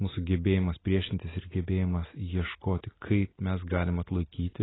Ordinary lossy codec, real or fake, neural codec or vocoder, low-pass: AAC, 16 kbps; real; none; 7.2 kHz